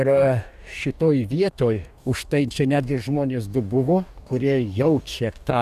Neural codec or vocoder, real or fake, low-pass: codec, 44.1 kHz, 2.6 kbps, DAC; fake; 14.4 kHz